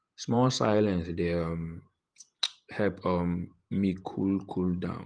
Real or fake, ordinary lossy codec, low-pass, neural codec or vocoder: fake; Opus, 24 kbps; 9.9 kHz; vocoder, 48 kHz, 128 mel bands, Vocos